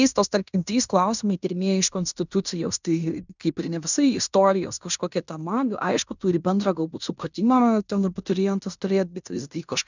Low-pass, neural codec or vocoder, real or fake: 7.2 kHz; codec, 16 kHz in and 24 kHz out, 0.9 kbps, LongCat-Audio-Codec, fine tuned four codebook decoder; fake